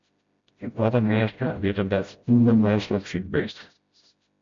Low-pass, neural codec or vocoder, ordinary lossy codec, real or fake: 7.2 kHz; codec, 16 kHz, 0.5 kbps, FreqCodec, smaller model; MP3, 48 kbps; fake